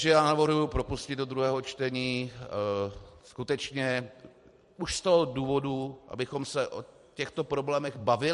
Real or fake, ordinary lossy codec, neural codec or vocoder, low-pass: fake; MP3, 48 kbps; vocoder, 44.1 kHz, 128 mel bands every 256 samples, BigVGAN v2; 14.4 kHz